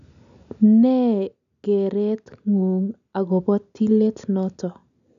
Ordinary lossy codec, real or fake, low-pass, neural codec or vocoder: none; real; 7.2 kHz; none